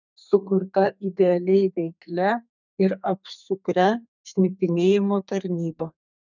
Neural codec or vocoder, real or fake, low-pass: codec, 32 kHz, 1.9 kbps, SNAC; fake; 7.2 kHz